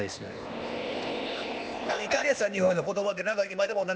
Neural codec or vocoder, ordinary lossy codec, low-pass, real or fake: codec, 16 kHz, 0.8 kbps, ZipCodec; none; none; fake